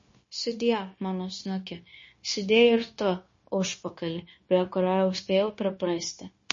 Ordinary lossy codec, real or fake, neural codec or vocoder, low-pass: MP3, 32 kbps; fake; codec, 16 kHz, 0.9 kbps, LongCat-Audio-Codec; 7.2 kHz